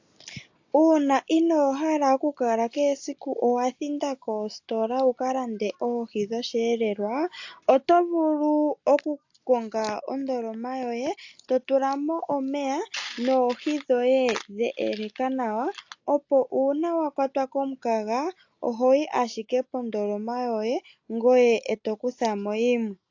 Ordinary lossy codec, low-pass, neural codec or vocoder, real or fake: AAC, 48 kbps; 7.2 kHz; none; real